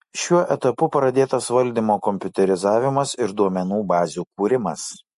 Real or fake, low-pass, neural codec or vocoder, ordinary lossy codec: real; 14.4 kHz; none; MP3, 48 kbps